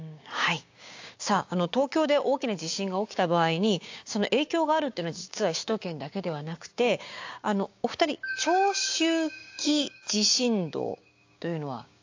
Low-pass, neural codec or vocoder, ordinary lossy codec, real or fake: 7.2 kHz; codec, 16 kHz, 6 kbps, DAC; AAC, 48 kbps; fake